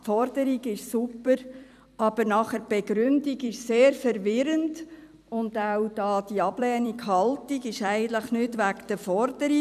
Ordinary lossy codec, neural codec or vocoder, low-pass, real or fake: none; vocoder, 44.1 kHz, 128 mel bands every 256 samples, BigVGAN v2; 14.4 kHz; fake